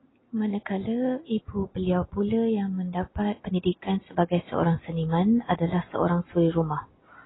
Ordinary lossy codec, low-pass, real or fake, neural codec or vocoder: AAC, 16 kbps; 7.2 kHz; real; none